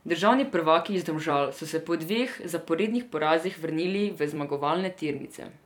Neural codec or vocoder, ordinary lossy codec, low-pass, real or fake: vocoder, 44.1 kHz, 128 mel bands every 256 samples, BigVGAN v2; none; 19.8 kHz; fake